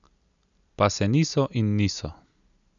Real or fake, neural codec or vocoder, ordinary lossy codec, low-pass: real; none; none; 7.2 kHz